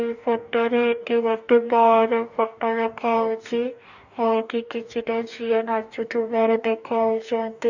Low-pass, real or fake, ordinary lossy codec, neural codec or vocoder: 7.2 kHz; fake; none; codec, 44.1 kHz, 2.6 kbps, DAC